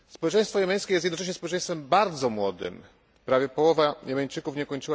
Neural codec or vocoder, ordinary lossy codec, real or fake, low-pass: none; none; real; none